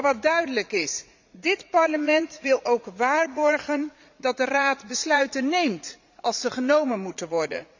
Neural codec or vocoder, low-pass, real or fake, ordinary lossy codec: vocoder, 44.1 kHz, 80 mel bands, Vocos; 7.2 kHz; fake; Opus, 64 kbps